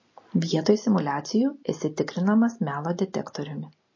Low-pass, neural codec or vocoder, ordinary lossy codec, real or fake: 7.2 kHz; none; MP3, 32 kbps; real